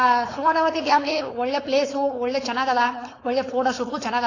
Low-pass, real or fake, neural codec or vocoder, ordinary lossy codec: 7.2 kHz; fake; codec, 16 kHz, 4.8 kbps, FACodec; AAC, 32 kbps